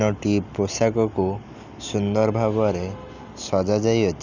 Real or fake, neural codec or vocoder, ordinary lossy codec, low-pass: real; none; none; 7.2 kHz